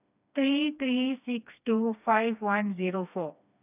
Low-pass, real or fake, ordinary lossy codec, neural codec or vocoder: 3.6 kHz; fake; none; codec, 16 kHz, 2 kbps, FreqCodec, smaller model